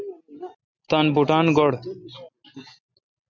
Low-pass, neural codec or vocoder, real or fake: 7.2 kHz; none; real